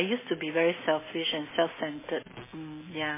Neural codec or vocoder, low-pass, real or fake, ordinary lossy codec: none; 3.6 kHz; real; MP3, 16 kbps